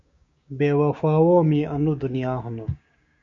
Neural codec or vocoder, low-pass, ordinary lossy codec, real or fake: codec, 16 kHz, 6 kbps, DAC; 7.2 kHz; MP3, 48 kbps; fake